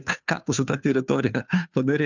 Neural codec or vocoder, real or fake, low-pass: codec, 16 kHz, 2 kbps, FunCodec, trained on Chinese and English, 25 frames a second; fake; 7.2 kHz